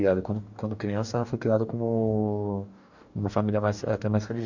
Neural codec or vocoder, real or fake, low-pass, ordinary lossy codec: codec, 44.1 kHz, 2.6 kbps, DAC; fake; 7.2 kHz; none